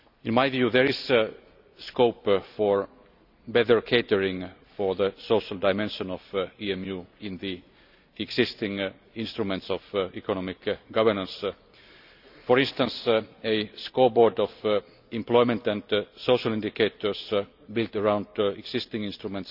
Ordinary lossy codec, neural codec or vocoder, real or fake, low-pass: none; none; real; 5.4 kHz